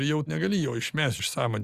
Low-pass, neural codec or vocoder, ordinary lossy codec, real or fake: 14.4 kHz; none; Opus, 64 kbps; real